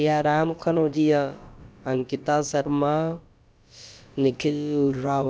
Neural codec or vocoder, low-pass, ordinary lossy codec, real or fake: codec, 16 kHz, about 1 kbps, DyCAST, with the encoder's durations; none; none; fake